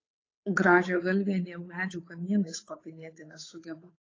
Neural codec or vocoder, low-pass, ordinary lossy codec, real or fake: codec, 16 kHz, 8 kbps, FunCodec, trained on Chinese and English, 25 frames a second; 7.2 kHz; AAC, 32 kbps; fake